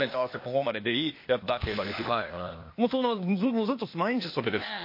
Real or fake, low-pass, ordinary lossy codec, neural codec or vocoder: fake; 5.4 kHz; MP3, 32 kbps; codec, 16 kHz, 0.8 kbps, ZipCodec